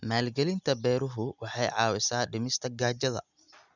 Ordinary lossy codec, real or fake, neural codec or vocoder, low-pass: none; real; none; 7.2 kHz